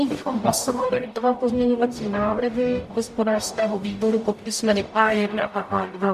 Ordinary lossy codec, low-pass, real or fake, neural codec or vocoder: MP3, 64 kbps; 14.4 kHz; fake; codec, 44.1 kHz, 0.9 kbps, DAC